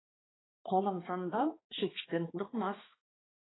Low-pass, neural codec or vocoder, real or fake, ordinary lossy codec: 7.2 kHz; codec, 16 kHz, 2 kbps, X-Codec, HuBERT features, trained on balanced general audio; fake; AAC, 16 kbps